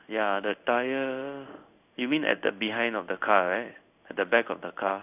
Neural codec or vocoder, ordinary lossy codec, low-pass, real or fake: codec, 16 kHz in and 24 kHz out, 1 kbps, XY-Tokenizer; none; 3.6 kHz; fake